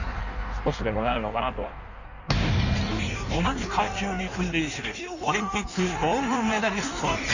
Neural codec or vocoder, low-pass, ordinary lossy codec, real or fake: codec, 16 kHz in and 24 kHz out, 1.1 kbps, FireRedTTS-2 codec; 7.2 kHz; none; fake